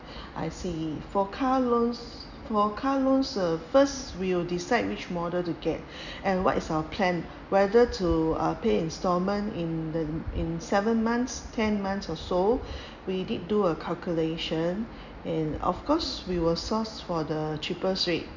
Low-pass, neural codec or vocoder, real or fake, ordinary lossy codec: 7.2 kHz; none; real; none